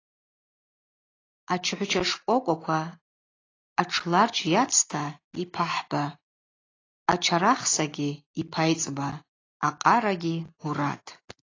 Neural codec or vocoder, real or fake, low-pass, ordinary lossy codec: none; real; 7.2 kHz; AAC, 32 kbps